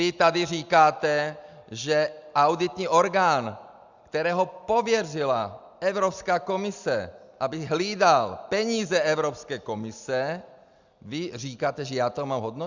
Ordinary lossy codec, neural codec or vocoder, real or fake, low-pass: Opus, 64 kbps; none; real; 7.2 kHz